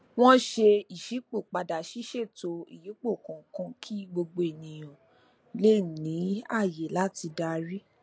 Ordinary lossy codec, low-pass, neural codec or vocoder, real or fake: none; none; none; real